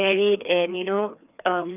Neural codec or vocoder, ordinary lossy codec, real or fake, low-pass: codec, 16 kHz, 2 kbps, FreqCodec, larger model; none; fake; 3.6 kHz